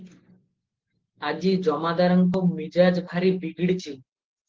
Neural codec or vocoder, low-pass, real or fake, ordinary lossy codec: none; 7.2 kHz; real; Opus, 16 kbps